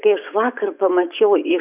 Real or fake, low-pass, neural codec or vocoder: fake; 3.6 kHz; vocoder, 24 kHz, 100 mel bands, Vocos